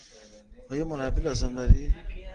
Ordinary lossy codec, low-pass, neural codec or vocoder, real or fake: Opus, 24 kbps; 9.9 kHz; none; real